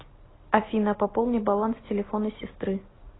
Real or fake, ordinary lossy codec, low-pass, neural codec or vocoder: real; AAC, 16 kbps; 7.2 kHz; none